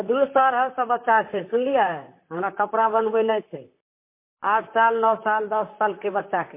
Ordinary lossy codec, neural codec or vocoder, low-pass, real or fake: MP3, 24 kbps; vocoder, 44.1 kHz, 128 mel bands, Pupu-Vocoder; 3.6 kHz; fake